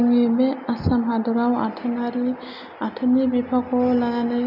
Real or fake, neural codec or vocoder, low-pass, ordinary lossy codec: real; none; 5.4 kHz; none